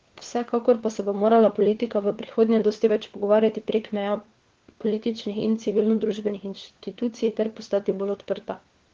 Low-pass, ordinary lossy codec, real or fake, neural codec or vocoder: 7.2 kHz; Opus, 16 kbps; fake; codec, 16 kHz, 4 kbps, FunCodec, trained on LibriTTS, 50 frames a second